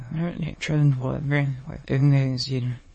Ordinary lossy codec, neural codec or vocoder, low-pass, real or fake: MP3, 32 kbps; autoencoder, 22.05 kHz, a latent of 192 numbers a frame, VITS, trained on many speakers; 9.9 kHz; fake